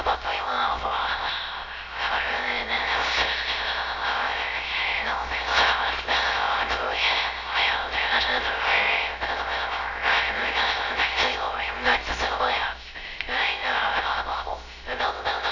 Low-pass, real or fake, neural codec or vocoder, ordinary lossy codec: 7.2 kHz; fake; codec, 16 kHz, 0.3 kbps, FocalCodec; none